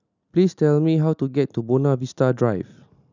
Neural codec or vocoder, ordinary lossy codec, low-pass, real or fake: none; none; 7.2 kHz; real